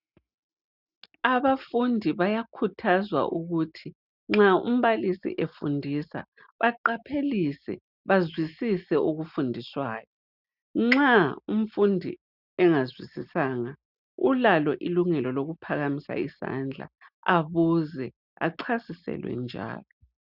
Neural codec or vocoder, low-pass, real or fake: none; 5.4 kHz; real